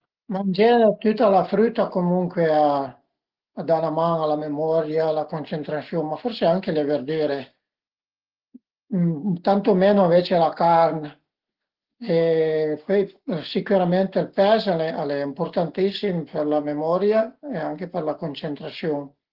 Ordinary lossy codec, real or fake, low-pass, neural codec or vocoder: Opus, 16 kbps; real; 5.4 kHz; none